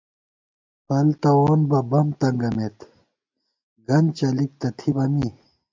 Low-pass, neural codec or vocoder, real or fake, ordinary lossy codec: 7.2 kHz; none; real; MP3, 64 kbps